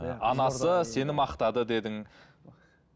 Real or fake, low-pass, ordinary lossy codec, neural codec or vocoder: real; none; none; none